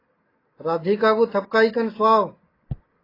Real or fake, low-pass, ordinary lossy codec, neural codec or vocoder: real; 5.4 kHz; AAC, 24 kbps; none